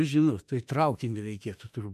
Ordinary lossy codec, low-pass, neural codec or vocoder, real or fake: AAC, 96 kbps; 14.4 kHz; autoencoder, 48 kHz, 32 numbers a frame, DAC-VAE, trained on Japanese speech; fake